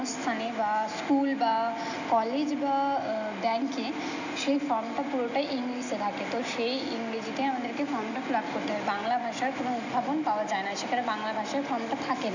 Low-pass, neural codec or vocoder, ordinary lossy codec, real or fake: 7.2 kHz; none; none; real